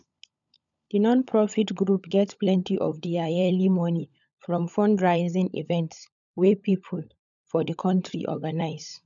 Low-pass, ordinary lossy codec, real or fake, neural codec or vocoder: 7.2 kHz; none; fake; codec, 16 kHz, 16 kbps, FunCodec, trained on LibriTTS, 50 frames a second